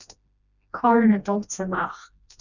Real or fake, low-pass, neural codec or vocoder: fake; 7.2 kHz; codec, 16 kHz, 1 kbps, FreqCodec, smaller model